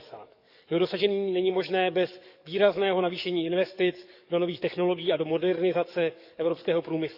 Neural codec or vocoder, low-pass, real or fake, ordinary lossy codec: codec, 44.1 kHz, 7.8 kbps, DAC; 5.4 kHz; fake; none